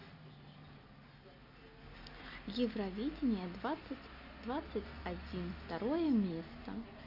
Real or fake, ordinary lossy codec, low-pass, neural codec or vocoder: real; none; 5.4 kHz; none